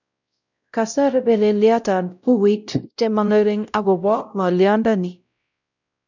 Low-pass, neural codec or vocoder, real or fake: 7.2 kHz; codec, 16 kHz, 0.5 kbps, X-Codec, WavLM features, trained on Multilingual LibriSpeech; fake